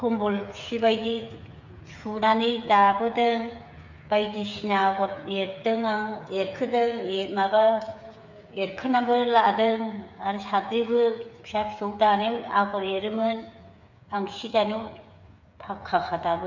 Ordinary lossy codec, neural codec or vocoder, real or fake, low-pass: MP3, 64 kbps; codec, 16 kHz, 8 kbps, FreqCodec, smaller model; fake; 7.2 kHz